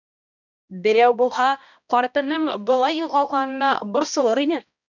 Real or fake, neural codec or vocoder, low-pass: fake; codec, 16 kHz, 1 kbps, X-Codec, HuBERT features, trained on balanced general audio; 7.2 kHz